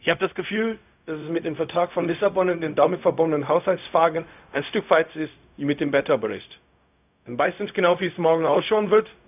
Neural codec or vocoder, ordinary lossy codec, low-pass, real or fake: codec, 16 kHz, 0.4 kbps, LongCat-Audio-Codec; none; 3.6 kHz; fake